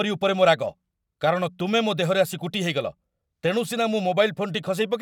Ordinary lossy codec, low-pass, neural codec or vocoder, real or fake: none; 19.8 kHz; none; real